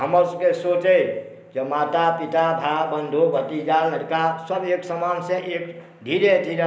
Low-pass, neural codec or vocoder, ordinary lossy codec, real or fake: none; none; none; real